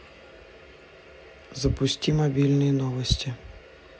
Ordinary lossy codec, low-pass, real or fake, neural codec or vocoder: none; none; real; none